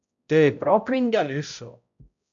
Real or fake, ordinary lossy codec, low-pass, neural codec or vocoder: fake; AAC, 64 kbps; 7.2 kHz; codec, 16 kHz, 0.5 kbps, X-Codec, HuBERT features, trained on balanced general audio